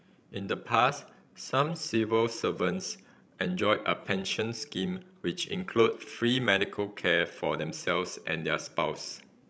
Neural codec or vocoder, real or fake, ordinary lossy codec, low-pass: codec, 16 kHz, 16 kbps, FreqCodec, larger model; fake; none; none